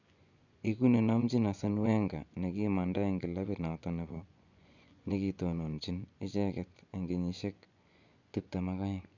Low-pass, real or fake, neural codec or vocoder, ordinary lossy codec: 7.2 kHz; fake; vocoder, 24 kHz, 100 mel bands, Vocos; none